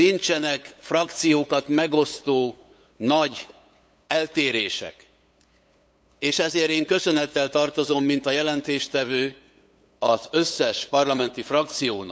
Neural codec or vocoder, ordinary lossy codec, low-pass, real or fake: codec, 16 kHz, 8 kbps, FunCodec, trained on LibriTTS, 25 frames a second; none; none; fake